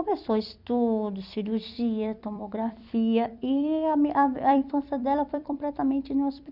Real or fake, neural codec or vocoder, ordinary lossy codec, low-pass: fake; vocoder, 44.1 kHz, 128 mel bands every 256 samples, BigVGAN v2; none; 5.4 kHz